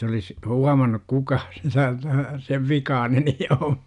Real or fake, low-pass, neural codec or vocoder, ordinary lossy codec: real; 10.8 kHz; none; none